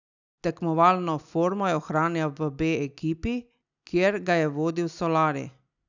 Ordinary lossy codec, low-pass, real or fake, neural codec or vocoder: none; 7.2 kHz; real; none